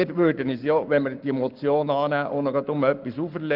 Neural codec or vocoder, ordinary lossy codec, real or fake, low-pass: codec, 44.1 kHz, 7.8 kbps, Pupu-Codec; Opus, 32 kbps; fake; 5.4 kHz